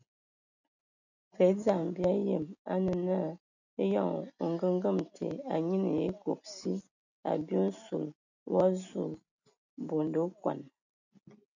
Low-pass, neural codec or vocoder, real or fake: 7.2 kHz; none; real